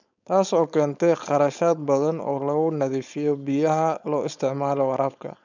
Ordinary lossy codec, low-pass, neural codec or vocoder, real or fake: none; 7.2 kHz; codec, 16 kHz, 4.8 kbps, FACodec; fake